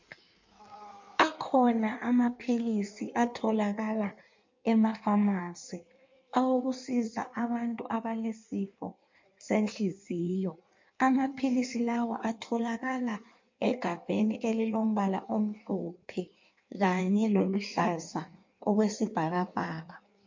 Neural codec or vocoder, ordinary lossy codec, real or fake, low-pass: codec, 16 kHz in and 24 kHz out, 1.1 kbps, FireRedTTS-2 codec; MP3, 48 kbps; fake; 7.2 kHz